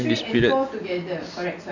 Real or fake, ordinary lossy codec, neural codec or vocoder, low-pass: real; none; none; 7.2 kHz